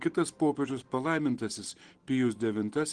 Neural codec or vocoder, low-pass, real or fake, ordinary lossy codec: none; 9.9 kHz; real; Opus, 16 kbps